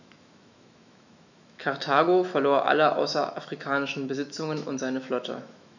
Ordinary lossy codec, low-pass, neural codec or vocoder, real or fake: none; 7.2 kHz; autoencoder, 48 kHz, 128 numbers a frame, DAC-VAE, trained on Japanese speech; fake